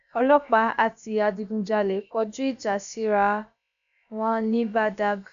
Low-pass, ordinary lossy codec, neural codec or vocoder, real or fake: 7.2 kHz; none; codec, 16 kHz, about 1 kbps, DyCAST, with the encoder's durations; fake